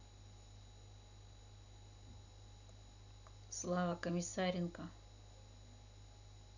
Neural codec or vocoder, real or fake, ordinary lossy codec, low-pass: none; real; none; 7.2 kHz